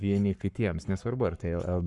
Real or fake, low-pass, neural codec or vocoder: fake; 10.8 kHz; codec, 44.1 kHz, 7.8 kbps, Pupu-Codec